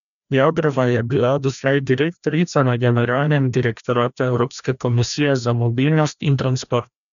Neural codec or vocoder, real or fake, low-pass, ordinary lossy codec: codec, 16 kHz, 1 kbps, FreqCodec, larger model; fake; 7.2 kHz; none